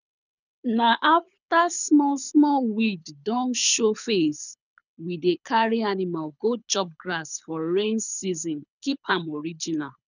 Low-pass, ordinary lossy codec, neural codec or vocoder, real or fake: 7.2 kHz; none; codec, 24 kHz, 6 kbps, HILCodec; fake